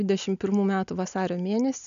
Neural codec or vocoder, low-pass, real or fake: none; 7.2 kHz; real